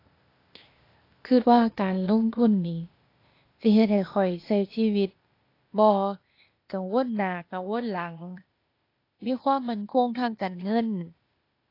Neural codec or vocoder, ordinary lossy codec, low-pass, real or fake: codec, 16 kHz, 0.8 kbps, ZipCodec; AAC, 32 kbps; 5.4 kHz; fake